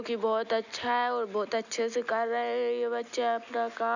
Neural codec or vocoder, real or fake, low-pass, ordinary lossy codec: none; real; 7.2 kHz; none